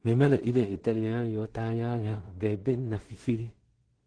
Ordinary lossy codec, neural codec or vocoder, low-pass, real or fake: Opus, 16 kbps; codec, 16 kHz in and 24 kHz out, 0.4 kbps, LongCat-Audio-Codec, two codebook decoder; 9.9 kHz; fake